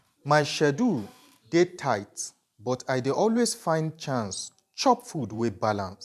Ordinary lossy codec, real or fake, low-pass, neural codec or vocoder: MP3, 96 kbps; fake; 14.4 kHz; vocoder, 44.1 kHz, 128 mel bands every 512 samples, BigVGAN v2